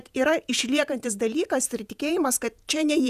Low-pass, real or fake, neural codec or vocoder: 14.4 kHz; fake; vocoder, 48 kHz, 128 mel bands, Vocos